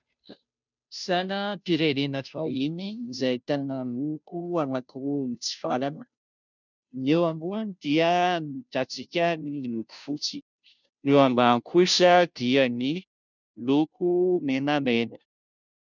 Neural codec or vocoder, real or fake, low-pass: codec, 16 kHz, 0.5 kbps, FunCodec, trained on Chinese and English, 25 frames a second; fake; 7.2 kHz